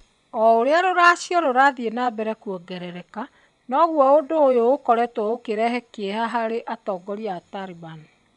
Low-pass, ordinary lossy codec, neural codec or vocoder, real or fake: 10.8 kHz; none; vocoder, 24 kHz, 100 mel bands, Vocos; fake